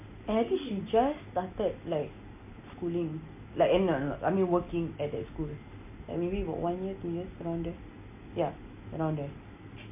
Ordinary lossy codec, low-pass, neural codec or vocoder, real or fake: MP3, 24 kbps; 3.6 kHz; none; real